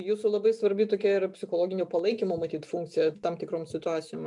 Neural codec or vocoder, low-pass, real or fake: none; 10.8 kHz; real